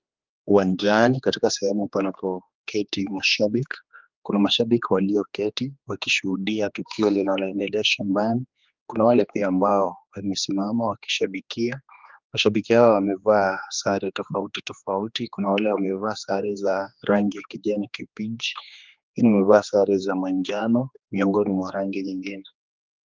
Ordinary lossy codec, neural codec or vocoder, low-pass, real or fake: Opus, 24 kbps; codec, 16 kHz, 2 kbps, X-Codec, HuBERT features, trained on general audio; 7.2 kHz; fake